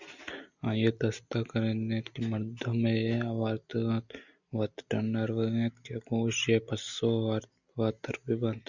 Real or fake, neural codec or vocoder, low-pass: real; none; 7.2 kHz